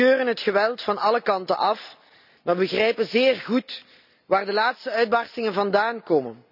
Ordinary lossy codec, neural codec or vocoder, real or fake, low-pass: none; none; real; 5.4 kHz